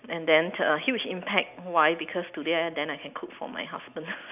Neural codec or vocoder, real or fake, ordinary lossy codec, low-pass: none; real; none; 3.6 kHz